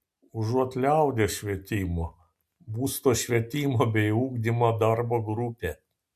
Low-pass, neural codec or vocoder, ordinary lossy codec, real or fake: 14.4 kHz; none; MP3, 96 kbps; real